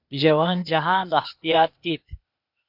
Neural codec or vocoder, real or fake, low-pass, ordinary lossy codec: codec, 16 kHz, 0.8 kbps, ZipCodec; fake; 5.4 kHz; MP3, 48 kbps